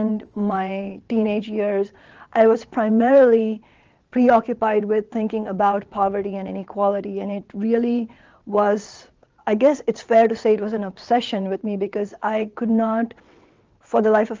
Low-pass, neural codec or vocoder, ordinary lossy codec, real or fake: 7.2 kHz; vocoder, 22.05 kHz, 80 mel bands, WaveNeXt; Opus, 24 kbps; fake